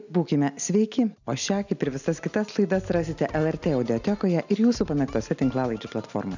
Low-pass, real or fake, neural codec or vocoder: 7.2 kHz; real; none